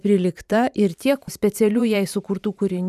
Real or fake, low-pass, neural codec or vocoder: fake; 14.4 kHz; vocoder, 44.1 kHz, 128 mel bands every 512 samples, BigVGAN v2